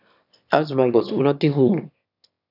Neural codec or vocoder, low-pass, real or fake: autoencoder, 22.05 kHz, a latent of 192 numbers a frame, VITS, trained on one speaker; 5.4 kHz; fake